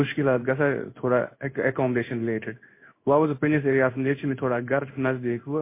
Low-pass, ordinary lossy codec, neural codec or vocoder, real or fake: 3.6 kHz; MP3, 24 kbps; codec, 16 kHz in and 24 kHz out, 1 kbps, XY-Tokenizer; fake